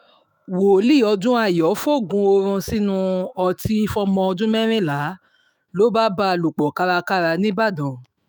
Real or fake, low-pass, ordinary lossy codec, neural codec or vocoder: fake; none; none; autoencoder, 48 kHz, 128 numbers a frame, DAC-VAE, trained on Japanese speech